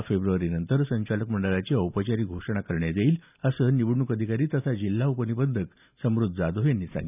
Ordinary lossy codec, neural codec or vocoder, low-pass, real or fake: none; none; 3.6 kHz; real